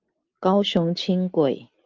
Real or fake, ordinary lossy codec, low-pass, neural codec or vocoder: real; Opus, 24 kbps; 7.2 kHz; none